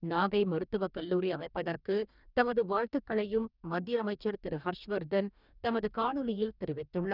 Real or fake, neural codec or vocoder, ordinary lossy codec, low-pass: fake; codec, 44.1 kHz, 2.6 kbps, DAC; none; 5.4 kHz